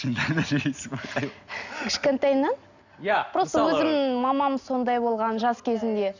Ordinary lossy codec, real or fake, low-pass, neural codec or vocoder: none; real; 7.2 kHz; none